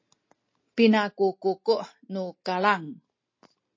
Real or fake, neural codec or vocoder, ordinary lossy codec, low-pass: real; none; MP3, 32 kbps; 7.2 kHz